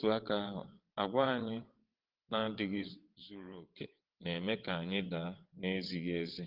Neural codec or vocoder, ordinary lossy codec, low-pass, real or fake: vocoder, 22.05 kHz, 80 mel bands, WaveNeXt; Opus, 16 kbps; 5.4 kHz; fake